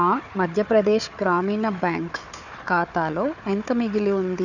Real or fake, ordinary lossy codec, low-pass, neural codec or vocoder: fake; none; 7.2 kHz; codec, 16 kHz, 4 kbps, FunCodec, trained on Chinese and English, 50 frames a second